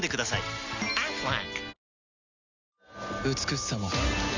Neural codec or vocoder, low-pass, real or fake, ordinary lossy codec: none; 7.2 kHz; real; Opus, 64 kbps